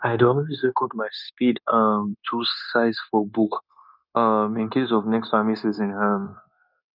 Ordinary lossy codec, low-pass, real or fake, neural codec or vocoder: none; 5.4 kHz; fake; codec, 16 kHz, 0.9 kbps, LongCat-Audio-Codec